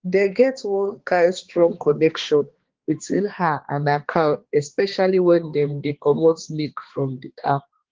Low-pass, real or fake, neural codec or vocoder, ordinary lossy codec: 7.2 kHz; fake; codec, 16 kHz, 2 kbps, X-Codec, HuBERT features, trained on balanced general audio; Opus, 16 kbps